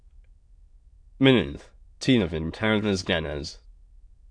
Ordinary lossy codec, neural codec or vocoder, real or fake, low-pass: AAC, 64 kbps; autoencoder, 22.05 kHz, a latent of 192 numbers a frame, VITS, trained on many speakers; fake; 9.9 kHz